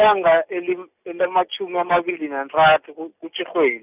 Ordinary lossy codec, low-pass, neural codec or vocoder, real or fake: none; 3.6 kHz; none; real